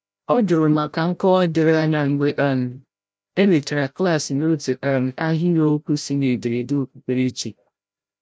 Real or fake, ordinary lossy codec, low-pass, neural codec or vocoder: fake; none; none; codec, 16 kHz, 0.5 kbps, FreqCodec, larger model